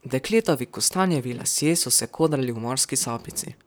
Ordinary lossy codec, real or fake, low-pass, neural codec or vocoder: none; fake; none; vocoder, 44.1 kHz, 128 mel bands, Pupu-Vocoder